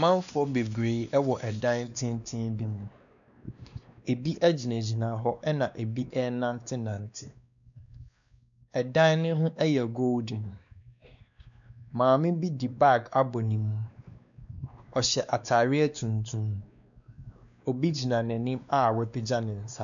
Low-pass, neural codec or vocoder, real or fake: 7.2 kHz; codec, 16 kHz, 2 kbps, X-Codec, WavLM features, trained on Multilingual LibriSpeech; fake